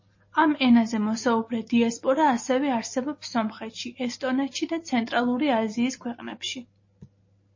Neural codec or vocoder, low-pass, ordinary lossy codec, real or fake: none; 7.2 kHz; MP3, 32 kbps; real